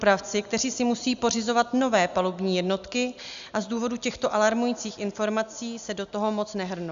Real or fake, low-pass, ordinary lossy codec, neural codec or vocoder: real; 7.2 kHz; Opus, 64 kbps; none